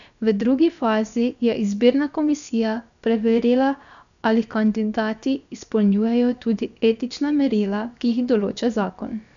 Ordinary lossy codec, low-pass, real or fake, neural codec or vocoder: none; 7.2 kHz; fake; codec, 16 kHz, about 1 kbps, DyCAST, with the encoder's durations